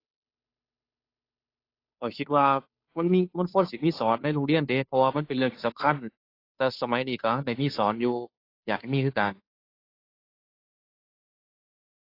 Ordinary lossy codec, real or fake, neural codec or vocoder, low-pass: AAC, 32 kbps; fake; codec, 16 kHz, 2 kbps, FunCodec, trained on Chinese and English, 25 frames a second; 5.4 kHz